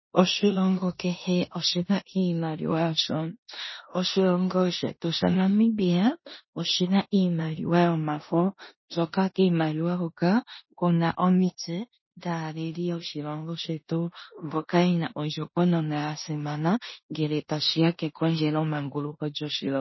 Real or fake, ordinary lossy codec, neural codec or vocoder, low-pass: fake; MP3, 24 kbps; codec, 16 kHz in and 24 kHz out, 0.9 kbps, LongCat-Audio-Codec, four codebook decoder; 7.2 kHz